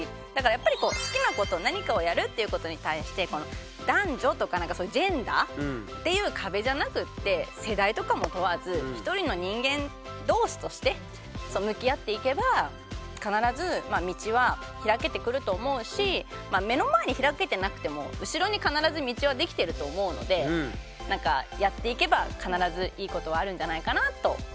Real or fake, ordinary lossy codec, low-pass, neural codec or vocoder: real; none; none; none